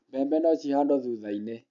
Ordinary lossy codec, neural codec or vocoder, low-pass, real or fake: none; none; 7.2 kHz; real